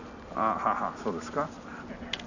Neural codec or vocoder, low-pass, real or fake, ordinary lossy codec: none; 7.2 kHz; real; none